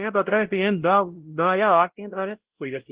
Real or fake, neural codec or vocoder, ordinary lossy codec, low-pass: fake; codec, 16 kHz, 0.5 kbps, X-Codec, WavLM features, trained on Multilingual LibriSpeech; Opus, 16 kbps; 3.6 kHz